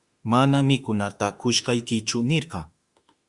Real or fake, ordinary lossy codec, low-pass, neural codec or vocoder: fake; Opus, 64 kbps; 10.8 kHz; autoencoder, 48 kHz, 32 numbers a frame, DAC-VAE, trained on Japanese speech